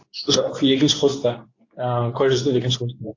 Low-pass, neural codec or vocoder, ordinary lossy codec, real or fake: 7.2 kHz; codec, 24 kHz, 0.9 kbps, WavTokenizer, medium speech release version 2; AAC, 48 kbps; fake